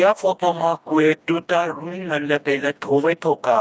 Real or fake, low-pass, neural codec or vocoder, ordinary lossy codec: fake; none; codec, 16 kHz, 1 kbps, FreqCodec, smaller model; none